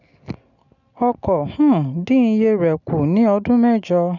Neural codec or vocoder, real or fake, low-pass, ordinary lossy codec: none; real; 7.2 kHz; none